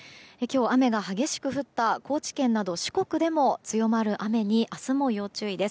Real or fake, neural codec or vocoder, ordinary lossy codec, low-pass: real; none; none; none